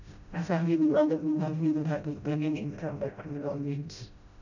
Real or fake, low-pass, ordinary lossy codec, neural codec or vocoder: fake; 7.2 kHz; none; codec, 16 kHz, 0.5 kbps, FreqCodec, smaller model